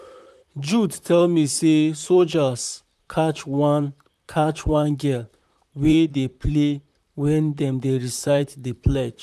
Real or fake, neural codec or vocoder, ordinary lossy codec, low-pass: fake; vocoder, 44.1 kHz, 128 mel bands, Pupu-Vocoder; none; 14.4 kHz